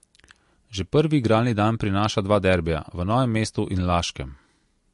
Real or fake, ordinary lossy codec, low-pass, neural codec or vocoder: real; MP3, 48 kbps; 14.4 kHz; none